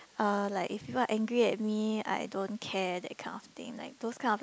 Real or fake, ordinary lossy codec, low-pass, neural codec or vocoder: real; none; none; none